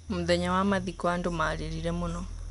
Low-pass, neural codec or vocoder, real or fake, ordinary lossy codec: 10.8 kHz; none; real; MP3, 96 kbps